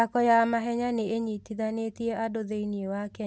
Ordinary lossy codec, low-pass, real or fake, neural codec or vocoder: none; none; real; none